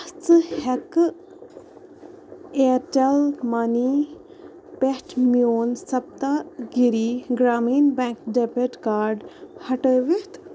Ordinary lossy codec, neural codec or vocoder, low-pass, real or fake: none; none; none; real